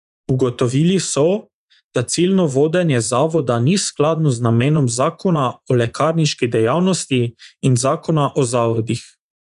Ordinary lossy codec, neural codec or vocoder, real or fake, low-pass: none; vocoder, 24 kHz, 100 mel bands, Vocos; fake; 10.8 kHz